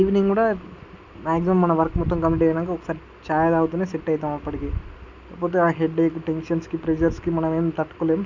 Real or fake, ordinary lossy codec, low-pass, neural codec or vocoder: real; none; 7.2 kHz; none